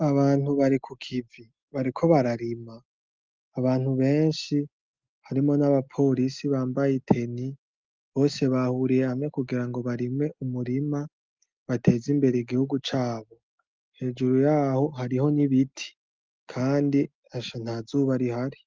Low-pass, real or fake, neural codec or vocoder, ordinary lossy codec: 7.2 kHz; real; none; Opus, 32 kbps